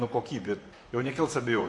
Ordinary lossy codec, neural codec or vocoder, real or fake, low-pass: MP3, 48 kbps; vocoder, 44.1 kHz, 128 mel bands, Pupu-Vocoder; fake; 10.8 kHz